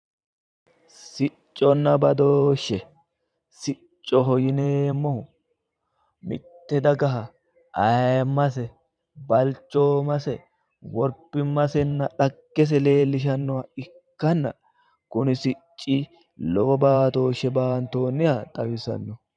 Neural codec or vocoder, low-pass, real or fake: vocoder, 44.1 kHz, 128 mel bands every 512 samples, BigVGAN v2; 9.9 kHz; fake